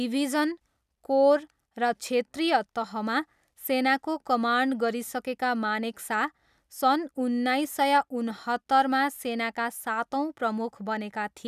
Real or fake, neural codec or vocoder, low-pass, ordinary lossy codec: real; none; 14.4 kHz; none